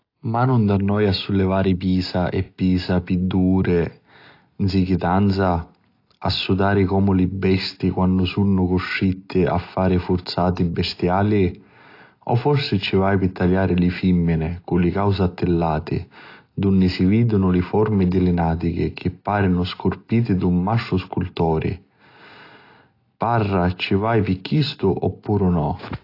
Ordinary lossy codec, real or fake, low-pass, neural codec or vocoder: AAC, 32 kbps; real; 5.4 kHz; none